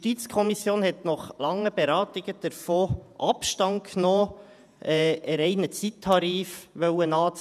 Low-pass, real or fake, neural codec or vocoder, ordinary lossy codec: 14.4 kHz; fake; vocoder, 48 kHz, 128 mel bands, Vocos; none